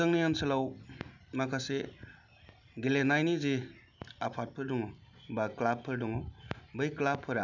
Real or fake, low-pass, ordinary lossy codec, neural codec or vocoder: real; 7.2 kHz; none; none